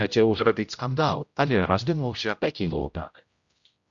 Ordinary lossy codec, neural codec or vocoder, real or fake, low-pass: Opus, 64 kbps; codec, 16 kHz, 0.5 kbps, X-Codec, HuBERT features, trained on general audio; fake; 7.2 kHz